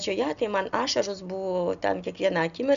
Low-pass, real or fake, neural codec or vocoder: 7.2 kHz; real; none